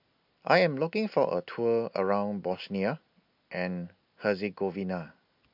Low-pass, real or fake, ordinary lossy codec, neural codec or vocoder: 5.4 kHz; real; MP3, 48 kbps; none